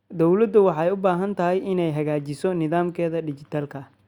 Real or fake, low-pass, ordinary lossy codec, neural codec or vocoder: real; 19.8 kHz; Opus, 64 kbps; none